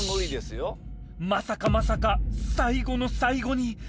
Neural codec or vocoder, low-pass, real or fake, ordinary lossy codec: none; none; real; none